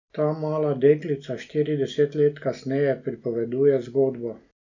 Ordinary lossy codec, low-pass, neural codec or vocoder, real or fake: none; 7.2 kHz; none; real